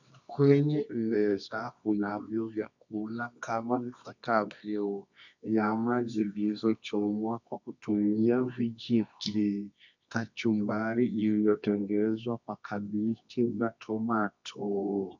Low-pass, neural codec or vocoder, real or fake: 7.2 kHz; codec, 24 kHz, 0.9 kbps, WavTokenizer, medium music audio release; fake